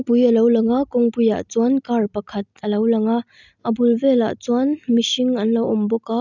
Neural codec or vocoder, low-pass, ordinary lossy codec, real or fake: none; 7.2 kHz; none; real